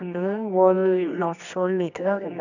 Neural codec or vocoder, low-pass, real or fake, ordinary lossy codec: codec, 24 kHz, 0.9 kbps, WavTokenizer, medium music audio release; 7.2 kHz; fake; none